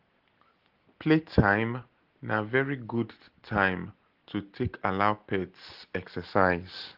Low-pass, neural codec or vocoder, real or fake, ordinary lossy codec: 5.4 kHz; none; real; Opus, 16 kbps